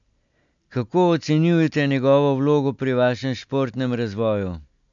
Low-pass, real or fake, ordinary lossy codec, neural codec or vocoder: 7.2 kHz; real; MP3, 64 kbps; none